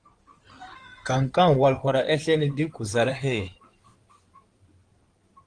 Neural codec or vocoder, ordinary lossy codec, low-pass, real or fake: codec, 16 kHz in and 24 kHz out, 2.2 kbps, FireRedTTS-2 codec; Opus, 24 kbps; 9.9 kHz; fake